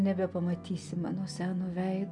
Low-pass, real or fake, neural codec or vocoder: 10.8 kHz; real; none